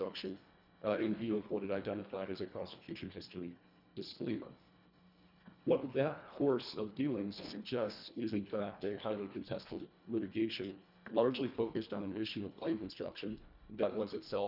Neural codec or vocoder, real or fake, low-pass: codec, 24 kHz, 1.5 kbps, HILCodec; fake; 5.4 kHz